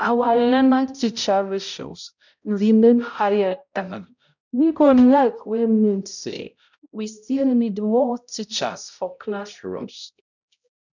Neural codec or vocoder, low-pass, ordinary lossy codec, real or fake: codec, 16 kHz, 0.5 kbps, X-Codec, HuBERT features, trained on balanced general audio; 7.2 kHz; none; fake